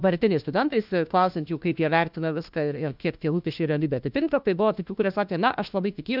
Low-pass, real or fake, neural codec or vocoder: 5.4 kHz; fake; codec, 16 kHz, 1 kbps, FunCodec, trained on LibriTTS, 50 frames a second